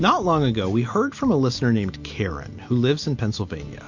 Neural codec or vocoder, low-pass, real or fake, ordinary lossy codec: none; 7.2 kHz; real; MP3, 48 kbps